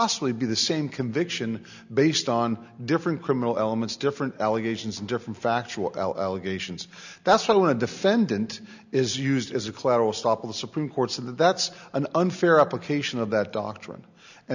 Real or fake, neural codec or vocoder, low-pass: real; none; 7.2 kHz